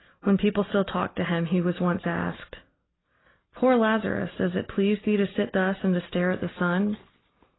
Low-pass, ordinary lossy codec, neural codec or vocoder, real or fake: 7.2 kHz; AAC, 16 kbps; none; real